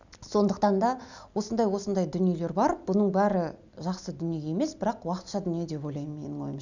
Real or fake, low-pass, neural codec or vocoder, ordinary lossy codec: real; 7.2 kHz; none; none